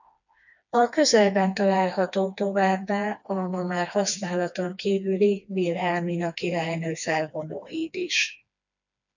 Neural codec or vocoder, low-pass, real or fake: codec, 16 kHz, 2 kbps, FreqCodec, smaller model; 7.2 kHz; fake